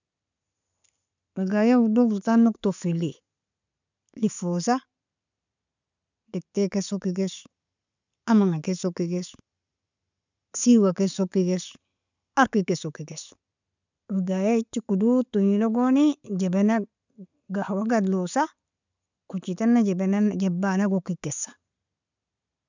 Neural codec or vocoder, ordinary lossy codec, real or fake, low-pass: none; none; real; 7.2 kHz